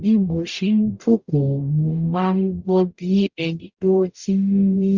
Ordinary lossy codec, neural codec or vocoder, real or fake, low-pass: Opus, 64 kbps; codec, 44.1 kHz, 0.9 kbps, DAC; fake; 7.2 kHz